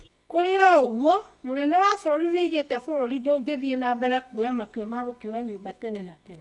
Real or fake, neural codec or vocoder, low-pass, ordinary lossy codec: fake; codec, 24 kHz, 0.9 kbps, WavTokenizer, medium music audio release; 10.8 kHz; none